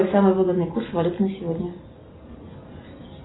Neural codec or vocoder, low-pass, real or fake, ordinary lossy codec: none; 7.2 kHz; real; AAC, 16 kbps